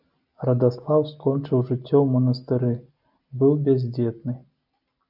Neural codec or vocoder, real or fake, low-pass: none; real; 5.4 kHz